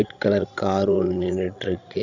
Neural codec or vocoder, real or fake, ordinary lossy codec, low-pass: codec, 16 kHz, 16 kbps, FreqCodec, larger model; fake; none; 7.2 kHz